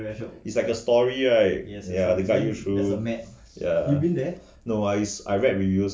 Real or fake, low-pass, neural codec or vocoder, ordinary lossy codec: real; none; none; none